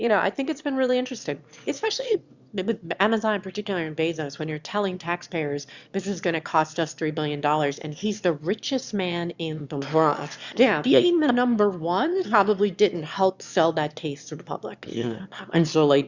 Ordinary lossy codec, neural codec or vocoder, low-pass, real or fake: Opus, 64 kbps; autoencoder, 22.05 kHz, a latent of 192 numbers a frame, VITS, trained on one speaker; 7.2 kHz; fake